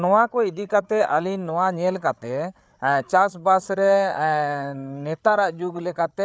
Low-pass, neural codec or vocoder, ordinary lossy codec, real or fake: none; codec, 16 kHz, 8 kbps, FreqCodec, larger model; none; fake